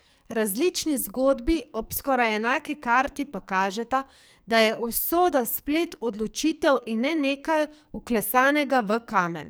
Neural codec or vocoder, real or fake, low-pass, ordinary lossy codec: codec, 44.1 kHz, 2.6 kbps, SNAC; fake; none; none